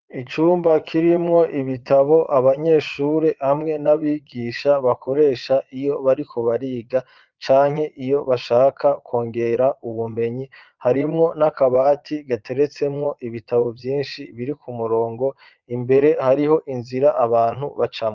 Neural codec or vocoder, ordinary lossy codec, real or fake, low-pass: vocoder, 22.05 kHz, 80 mel bands, Vocos; Opus, 24 kbps; fake; 7.2 kHz